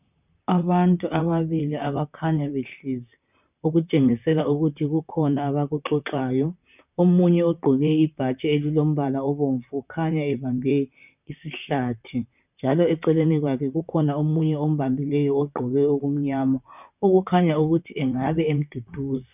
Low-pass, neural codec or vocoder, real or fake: 3.6 kHz; vocoder, 44.1 kHz, 128 mel bands, Pupu-Vocoder; fake